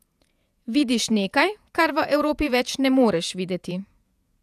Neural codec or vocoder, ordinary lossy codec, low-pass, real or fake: vocoder, 48 kHz, 128 mel bands, Vocos; none; 14.4 kHz; fake